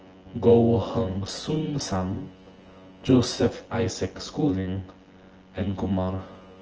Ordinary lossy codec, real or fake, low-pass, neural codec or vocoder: Opus, 16 kbps; fake; 7.2 kHz; vocoder, 24 kHz, 100 mel bands, Vocos